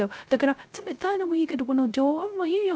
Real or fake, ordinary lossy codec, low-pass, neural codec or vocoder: fake; none; none; codec, 16 kHz, 0.3 kbps, FocalCodec